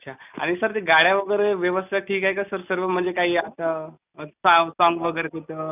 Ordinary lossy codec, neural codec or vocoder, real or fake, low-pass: none; none; real; 3.6 kHz